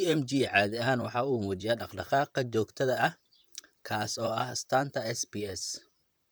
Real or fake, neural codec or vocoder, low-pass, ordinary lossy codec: fake; vocoder, 44.1 kHz, 128 mel bands, Pupu-Vocoder; none; none